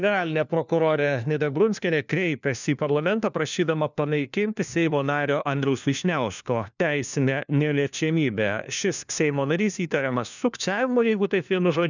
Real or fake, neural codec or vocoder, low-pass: fake; codec, 16 kHz, 1 kbps, FunCodec, trained on LibriTTS, 50 frames a second; 7.2 kHz